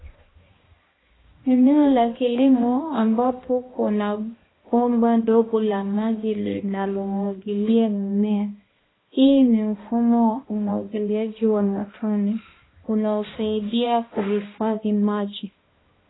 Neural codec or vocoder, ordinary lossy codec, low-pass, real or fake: codec, 16 kHz, 1 kbps, X-Codec, HuBERT features, trained on balanced general audio; AAC, 16 kbps; 7.2 kHz; fake